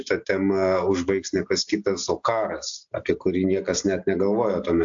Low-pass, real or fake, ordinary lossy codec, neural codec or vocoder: 7.2 kHz; real; AAC, 64 kbps; none